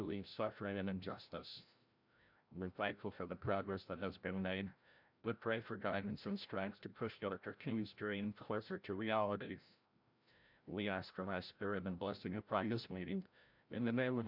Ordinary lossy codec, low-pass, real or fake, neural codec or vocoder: MP3, 48 kbps; 5.4 kHz; fake; codec, 16 kHz, 0.5 kbps, FreqCodec, larger model